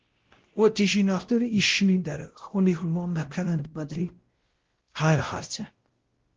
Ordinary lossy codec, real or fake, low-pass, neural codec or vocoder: Opus, 16 kbps; fake; 7.2 kHz; codec, 16 kHz, 0.5 kbps, X-Codec, WavLM features, trained on Multilingual LibriSpeech